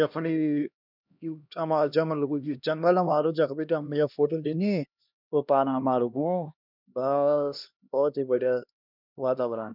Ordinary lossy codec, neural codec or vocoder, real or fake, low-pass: none; codec, 16 kHz, 1 kbps, X-Codec, HuBERT features, trained on LibriSpeech; fake; 5.4 kHz